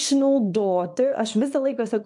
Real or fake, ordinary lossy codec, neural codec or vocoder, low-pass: fake; MP3, 64 kbps; codec, 24 kHz, 0.9 kbps, WavTokenizer, small release; 10.8 kHz